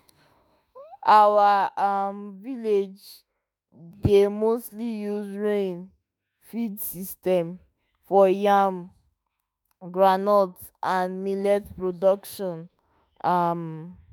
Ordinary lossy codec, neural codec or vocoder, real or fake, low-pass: none; autoencoder, 48 kHz, 32 numbers a frame, DAC-VAE, trained on Japanese speech; fake; none